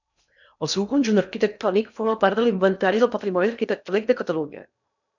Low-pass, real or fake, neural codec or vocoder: 7.2 kHz; fake; codec, 16 kHz in and 24 kHz out, 0.8 kbps, FocalCodec, streaming, 65536 codes